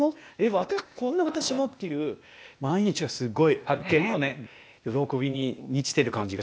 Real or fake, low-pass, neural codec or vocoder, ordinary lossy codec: fake; none; codec, 16 kHz, 0.8 kbps, ZipCodec; none